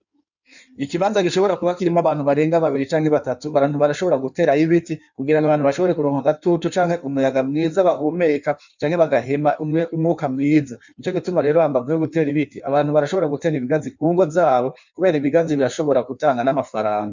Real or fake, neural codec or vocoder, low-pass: fake; codec, 16 kHz in and 24 kHz out, 1.1 kbps, FireRedTTS-2 codec; 7.2 kHz